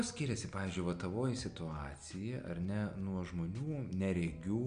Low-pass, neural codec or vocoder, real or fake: 9.9 kHz; none; real